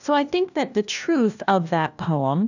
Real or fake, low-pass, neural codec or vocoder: fake; 7.2 kHz; codec, 16 kHz, 1 kbps, FunCodec, trained on LibriTTS, 50 frames a second